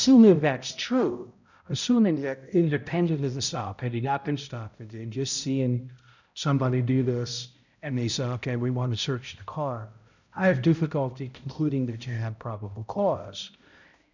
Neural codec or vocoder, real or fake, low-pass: codec, 16 kHz, 0.5 kbps, X-Codec, HuBERT features, trained on balanced general audio; fake; 7.2 kHz